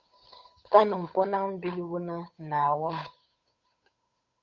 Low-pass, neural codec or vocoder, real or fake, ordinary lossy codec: 7.2 kHz; codec, 16 kHz, 8 kbps, FunCodec, trained on Chinese and English, 25 frames a second; fake; MP3, 64 kbps